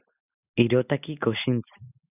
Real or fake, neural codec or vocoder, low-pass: real; none; 3.6 kHz